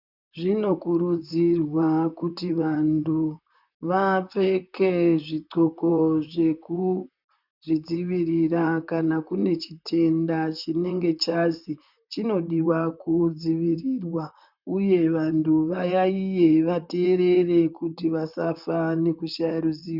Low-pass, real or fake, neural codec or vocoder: 5.4 kHz; fake; vocoder, 44.1 kHz, 128 mel bands, Pupu-Vocoder